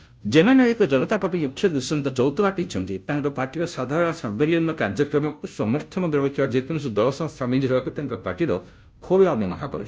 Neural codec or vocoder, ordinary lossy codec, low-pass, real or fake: codec, 16 kHz, 0.5 kbps, FunCodec, trained on Chinese and English, 25 frames a second; none; none; fake